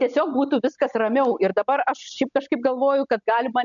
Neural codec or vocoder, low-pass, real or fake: none; 7.2 kHz; real